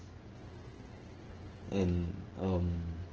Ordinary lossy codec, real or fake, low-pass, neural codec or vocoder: Opus, 16 kbps; fake; 7.2 kHz; codec, 16 kHz, 16 kbps, FreqCodec, smaller model